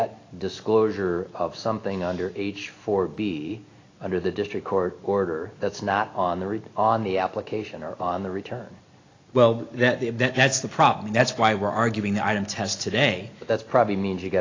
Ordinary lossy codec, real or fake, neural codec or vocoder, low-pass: AAC, 32 kbps; real; none; 7.2 kHz